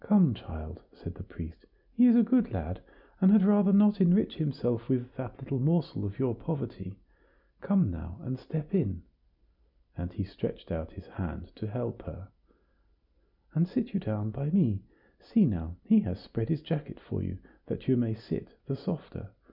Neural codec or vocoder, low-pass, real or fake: none; 5.4 kHz; real